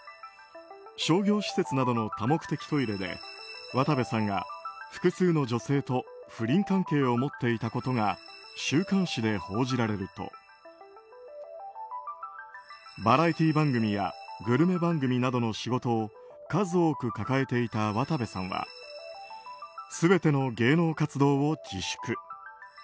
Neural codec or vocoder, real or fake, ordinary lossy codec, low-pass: none; real; none; none